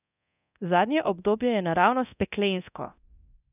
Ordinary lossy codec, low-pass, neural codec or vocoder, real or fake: none; 3.6 kHz; codec, 24 kHz, 0.9 kbps, DualCodec; fake